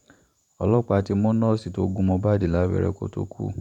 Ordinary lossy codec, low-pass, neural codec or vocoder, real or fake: none; 19.8 kHz; vocoder, 44.1 kHz, 128 mel bands every 512 samples, BigVGAN v2; fake